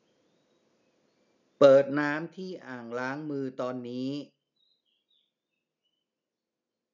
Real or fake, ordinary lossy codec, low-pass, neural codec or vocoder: real; none; 7.2 kHz; none